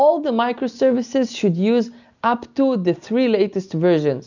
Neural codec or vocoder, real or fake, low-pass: none; real; 7.2 kHz